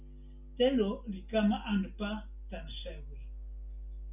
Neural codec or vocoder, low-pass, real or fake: none; 3.6 kHz; real